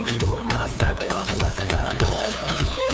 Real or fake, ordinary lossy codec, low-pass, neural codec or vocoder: fake; none; none; codec, 16 kHz, 2 kbps, FunCodec, trained on LibriTTS, 25 frames a second